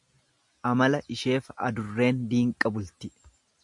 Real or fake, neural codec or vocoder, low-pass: real; none; 10.8 kHz